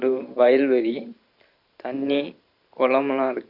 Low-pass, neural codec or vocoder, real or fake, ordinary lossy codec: 5.4 kHz; vocoder, 44.1 kHz, 128 mel bands every 512 samples, BigVGAN v2; fake; none